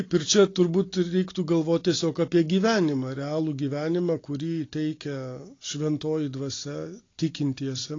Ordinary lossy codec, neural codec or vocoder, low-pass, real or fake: AAC, 32 kbps; none; 7.2 kHz; real